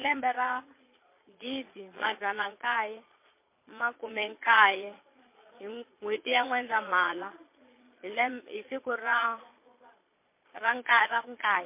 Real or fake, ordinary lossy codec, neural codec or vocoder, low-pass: fake; MP3, 24 kbps; vocoder, 22.05 kHz, 80 mel bands, Vocos; 3.6 kHz